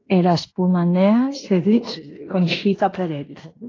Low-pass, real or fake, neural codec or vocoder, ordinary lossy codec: 7.2 kHz; fake; codec, 16 kHz in and 24 kHz out, 0.9 kbps, LongCat-Audio-Codec, fine tuned four codebook decoder; AAC, 32 kbps